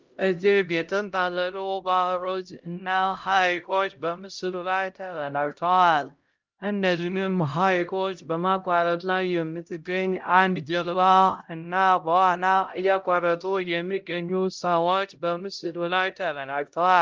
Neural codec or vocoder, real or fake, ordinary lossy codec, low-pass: codec, 16 kHz, 0.5 kbps, X-Codec, HuBERT features, trained on LibriSpeech; fake; Opus, 32 kbps; 7.2 kHz